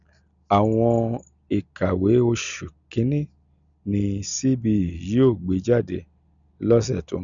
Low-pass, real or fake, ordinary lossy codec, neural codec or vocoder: 7.2 kHz; real; none; none